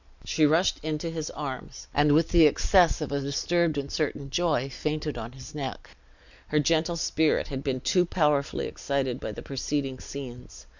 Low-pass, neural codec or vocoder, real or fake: 7.2 kHz; none; real